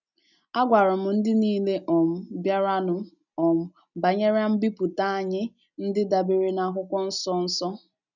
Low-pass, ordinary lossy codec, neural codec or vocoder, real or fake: 7.2 kHz; none; none; real